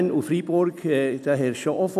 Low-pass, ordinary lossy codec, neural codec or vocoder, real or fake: 14.4 kHz; none; none; real